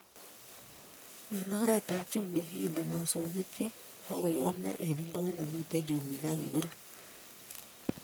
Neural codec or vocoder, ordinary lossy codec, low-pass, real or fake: codec, 44.1 kHz, 1.7 kbps, Pupu-Codec; none; none; fake